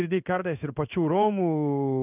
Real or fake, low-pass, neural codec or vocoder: fake; 3.6 kHz; codec, 16 kHz in and 24 kHz out, 1 kbps, XY-Tokenizer